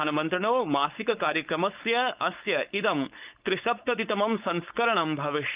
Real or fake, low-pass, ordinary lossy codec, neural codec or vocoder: fake; 3.6 kHz; Opus, 24 kbps; codec, 16 kHz, 4.8 kbps, FACodec